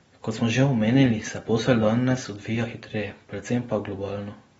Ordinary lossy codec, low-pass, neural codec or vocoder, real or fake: AAC, 24 kbps; 14.4 kHz; none; real